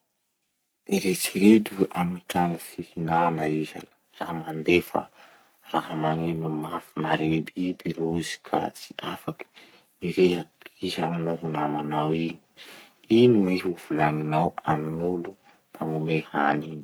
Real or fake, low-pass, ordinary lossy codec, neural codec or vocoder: fake; none; none; codec, 44.1 kHz, 3.4 kbps, Pupu-Codec